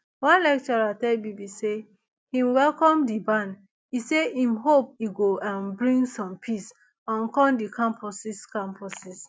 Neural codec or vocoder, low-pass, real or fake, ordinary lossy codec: none; none; real; none